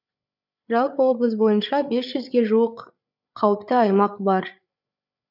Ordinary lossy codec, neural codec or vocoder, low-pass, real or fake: none; codec, 16 kHz, 8 kbps, FreqCodec, larger model; 5.4 kHz; fake